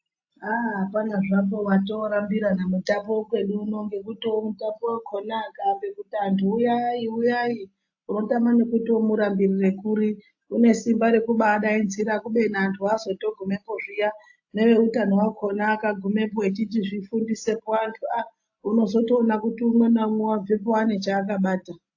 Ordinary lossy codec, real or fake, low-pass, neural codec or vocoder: AAC, 48 kbps; real; 7.2 kHz; none